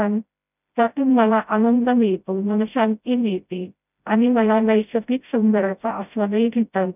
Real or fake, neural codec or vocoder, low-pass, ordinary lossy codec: fake; codec, 16 kHz, 0.5 kbps, FreqCodec, smaller model; 3.6 kHz; none